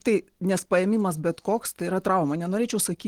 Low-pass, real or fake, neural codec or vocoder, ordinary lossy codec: 19.8 kHz; real; none; Opus, 16 kbps